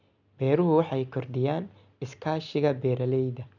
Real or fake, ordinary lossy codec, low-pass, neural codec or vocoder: real; none; 7.2 kHz; none